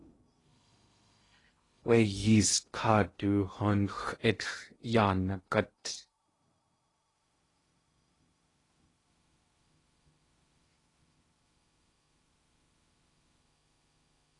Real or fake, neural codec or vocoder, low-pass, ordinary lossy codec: fake; codec, 16 kHz in and 24 kHz out, 0.6 kbps, FocalCodec, streaming, 2048 codes; 10.8 kHz; AAC, 32 kbps